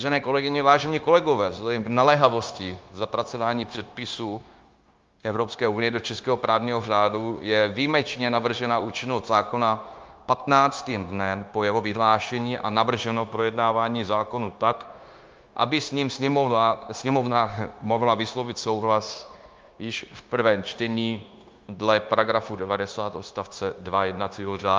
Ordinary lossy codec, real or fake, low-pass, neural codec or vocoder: Opus, 32 kbps; fake; 7.2 kHz; codec, 16 kHz, 0.9 kbps, LongCat-Audio-Codec